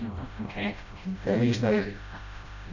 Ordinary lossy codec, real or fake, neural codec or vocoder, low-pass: none; fake; codec, 16 kHz, 0.5 kbps, FreqCodec, smaller model; 7.2 kHz